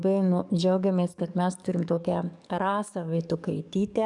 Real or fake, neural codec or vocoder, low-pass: fake; codec, 44.1 kHz, 7.8 kbps, DAC; 10.8 kHz